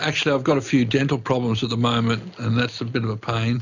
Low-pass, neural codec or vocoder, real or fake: 7.2 kHz; none; real